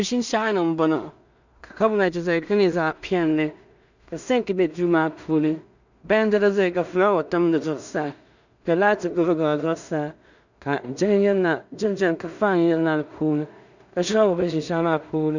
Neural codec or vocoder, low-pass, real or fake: codec, 16 kHz in and 24 kHz out, 0.4 kbps, LongCat-Audio-Codec, two codebook decoder; 7.2 kHz; fake